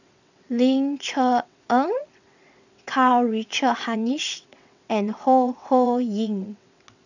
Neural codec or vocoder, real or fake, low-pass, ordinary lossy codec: vocoder, 22.05 kHz, 80 mel bands, WaveNeXt; fake; 7.2 kHz; none